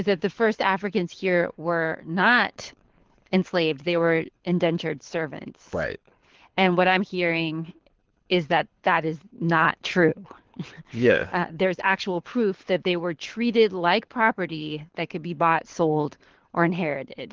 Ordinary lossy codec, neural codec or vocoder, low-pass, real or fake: Opus, 16 kbps; codec, 24 kHz, 6 kbps, HILCodec; 7.2 kHz; fake